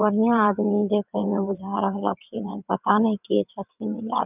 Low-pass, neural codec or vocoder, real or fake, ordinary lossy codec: 3.6 kHz; vocoder, 22.05 kHz, 80 mel bands, WaveNeXt; fake; none